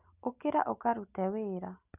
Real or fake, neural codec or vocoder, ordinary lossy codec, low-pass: real; none; none; 3.6 kHz